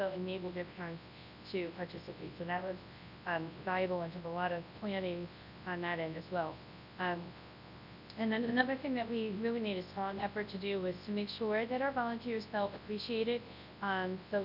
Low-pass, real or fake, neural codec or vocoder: 5.4 kHz; fake; codec, 24 kHz, 0.9 kbps, WavTokenizer, large speech release